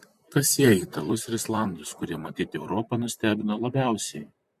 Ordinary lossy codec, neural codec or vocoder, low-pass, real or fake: MP3, 64 kbps; vocoder, 44.1 kHz, 128 mel bands, Pupu-Vocoder; 14.4 kHz; fake